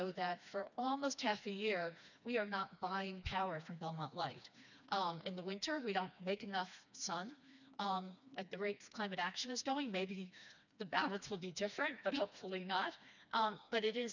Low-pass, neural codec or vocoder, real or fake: 7.2 kHz; codec, 16 kHz, 2 kbps, FreqCodec, smaller model; fake